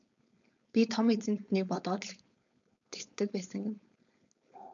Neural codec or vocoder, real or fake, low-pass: codec, 16 kHz, 4.8 kbps, FACodec; fake; 7.2 kHz